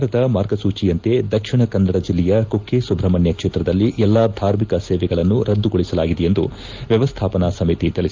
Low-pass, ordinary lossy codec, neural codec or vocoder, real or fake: 7.2 kHz; Opus, 32 kbps; none; real